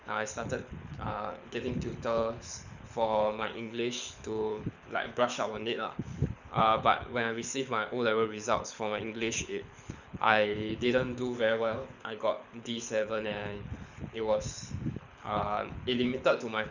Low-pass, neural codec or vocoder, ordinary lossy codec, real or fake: 7.2 kHz; codec, 24 kHz, 6 kbps, HILCodec; none; fake